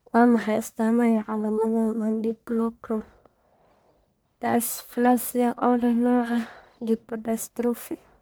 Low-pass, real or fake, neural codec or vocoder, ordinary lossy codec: none; fake; codec, 44.1 kHz, 1.7 kbps, Pupu-Codec; none